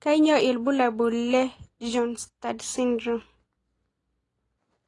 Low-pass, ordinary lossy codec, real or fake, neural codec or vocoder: 10.8 kHz; AAC, 32 kbps; real; none